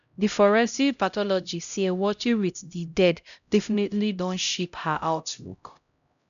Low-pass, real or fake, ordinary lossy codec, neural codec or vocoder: 7.2 kHz; fake; none; codec, 16 kHz, 0.5 kbps, X-Codec, HuBERT features, trained on LibriSpeech